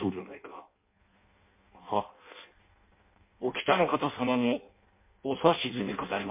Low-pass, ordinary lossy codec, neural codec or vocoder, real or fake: 3.6 kHz; MP3, 24 kbps; codec, 16 kHz in and 24 kHz out, 0.6 kbps, FireRedTTS-2 codec; fake